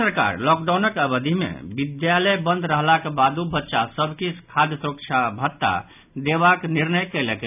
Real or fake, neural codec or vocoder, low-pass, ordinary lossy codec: real; none; 3.6 kHz; none